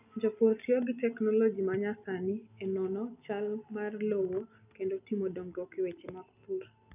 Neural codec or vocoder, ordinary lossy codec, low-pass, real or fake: none; none; 3.6 kHz; real